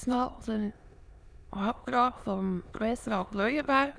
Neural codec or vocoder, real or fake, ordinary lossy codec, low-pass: autoencoder, 22.05 kHz, a latent of 192 numbers a frame, VITS, trained on many speakers; fake; none; none